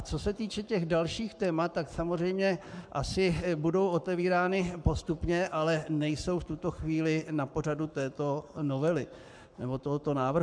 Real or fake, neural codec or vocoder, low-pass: fake; codec, 44.1 kHz, 7.8 kbps, Pupu-Codec; 9.9 kHz